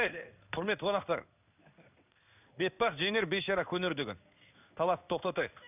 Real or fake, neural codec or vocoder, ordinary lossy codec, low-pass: fake; codec, 16 kHz in and 24 kHz out, 1 kbps, XY-Tokenizer; none; 3.6 kHz